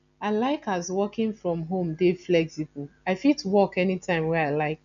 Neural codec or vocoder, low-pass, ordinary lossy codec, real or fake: none; 7.2 kHz; none; real